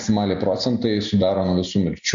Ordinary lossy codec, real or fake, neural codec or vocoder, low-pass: MP3, 48 kbps; real; none; 7.2 kHz